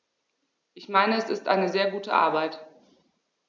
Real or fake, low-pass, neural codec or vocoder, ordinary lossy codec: real; none; none; none